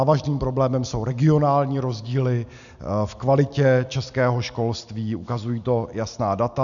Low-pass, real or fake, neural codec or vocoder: 7.2 kHz; real; none